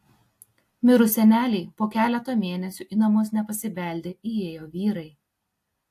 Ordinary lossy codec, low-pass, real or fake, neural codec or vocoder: AAC, 64 kbps; 14.4 kHz; real; none